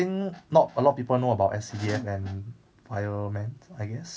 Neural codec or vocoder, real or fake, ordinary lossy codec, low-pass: none; real; none; none